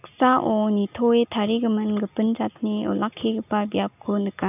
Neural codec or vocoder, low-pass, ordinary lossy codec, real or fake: none; 3.6 kHz; none; real